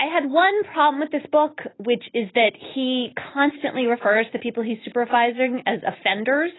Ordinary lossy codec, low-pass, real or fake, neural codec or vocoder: AAC, 16 kbps; 7.2 kHz; real; none